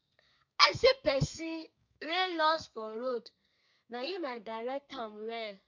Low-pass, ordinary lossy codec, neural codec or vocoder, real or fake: 7.2 kHz; MP3, 64 kbps; codec, 32 kHz, 1.9 kbps, SNAC; fake